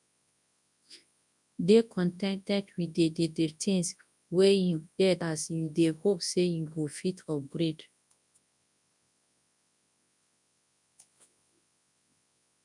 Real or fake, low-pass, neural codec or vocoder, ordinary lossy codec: fake; 10.8 kHz; codec, 24 kHz, 0.9 kbps, WavTokenizer, large speech release; none